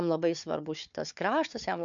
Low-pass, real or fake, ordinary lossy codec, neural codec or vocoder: 7.2 kHz; fake; MP3, 64 kbps; codec, 16 kHz, 4 kbps, FunCodec, trained on Chinese and English, 50 frames a second